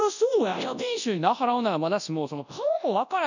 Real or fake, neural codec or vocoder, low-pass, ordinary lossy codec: fake; codec, 24 kHz, 0.9 kbps, WavTokenizer, large speech release; 7.2 kHz; none